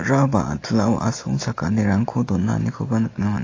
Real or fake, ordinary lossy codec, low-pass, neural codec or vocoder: real; AAC, 32 kbps; 7.2 kHz; none